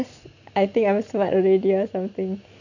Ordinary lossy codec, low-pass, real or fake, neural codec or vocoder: none; 7.2 kHz; real; none